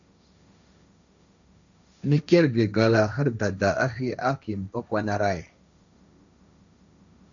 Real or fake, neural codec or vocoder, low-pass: fake; codec, 16 kHz, 1.1 kbps, Voila-Tokenizer; 7.2 kHz